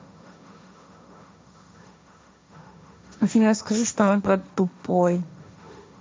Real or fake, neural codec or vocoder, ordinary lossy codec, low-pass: fake; codec, 16 kHz, 1.1 kbps, Voila-Tokenizer; none; none